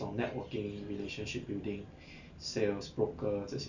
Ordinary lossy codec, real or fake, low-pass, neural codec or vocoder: none; real; 7.2 kHz; none